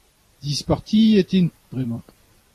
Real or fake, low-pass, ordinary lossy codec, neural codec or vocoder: fake; 14.4 kHz; AAC, 48 kbps; vocoder, 44.1 kHz, 128 mel bands every 256 samples, BigVGAN v2